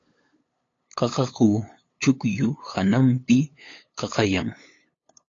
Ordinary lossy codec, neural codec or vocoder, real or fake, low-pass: AAC, 32 kbps; codec, 16 kHz, 8 kbps, FunCodec, trained on LibriTTS, 25 frames a second; fake; 7.2 kHz